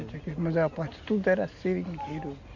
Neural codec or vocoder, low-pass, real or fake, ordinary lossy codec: none; 7.2 kHz; real; none